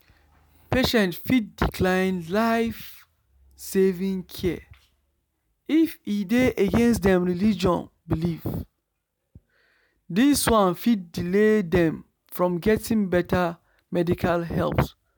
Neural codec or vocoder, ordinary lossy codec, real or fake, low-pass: none; none; real; none